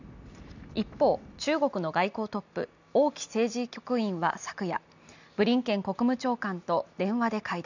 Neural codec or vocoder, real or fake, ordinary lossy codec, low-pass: none; real; none; 7.2 kHz